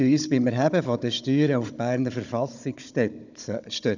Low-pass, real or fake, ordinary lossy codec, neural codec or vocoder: 7.2 kHz; fake; none; codec, 16 kHz, 16 kbps, FunCodec, trained on Chinese and English, 50 frames a second